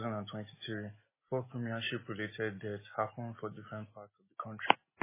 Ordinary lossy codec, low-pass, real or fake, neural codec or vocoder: MP3, 16 kbps; 3.6 kHz; real; none